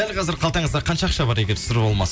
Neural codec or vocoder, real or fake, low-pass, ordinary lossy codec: none; real; none; none